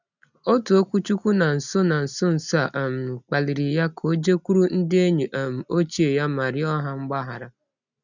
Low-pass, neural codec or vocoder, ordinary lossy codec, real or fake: 7.2 kHz; none; none; real